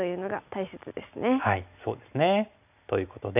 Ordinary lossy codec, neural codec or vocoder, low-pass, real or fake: none; none; 3.6 kHz; real